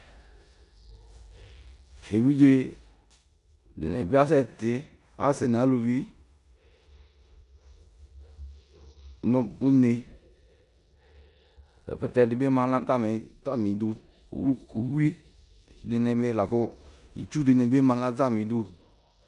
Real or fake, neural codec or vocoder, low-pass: fake; codec, 16 kHz in and 24 kHz out, 0.9 kbps, LongCat-Audio-Codec, four codebook decoder; 10.8 kHz